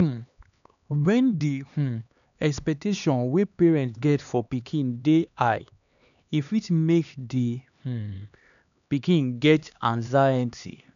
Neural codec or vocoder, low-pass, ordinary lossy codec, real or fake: codec, 16 kHz, 2 kbps, X-Codec, HuBERT features, trained on LibriSpeech; 7.2 kHz; none; fake